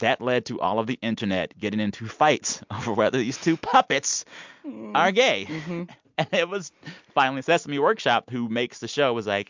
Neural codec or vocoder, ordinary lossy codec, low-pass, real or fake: none; MP3, 64 kbps; 7.2 kHz; real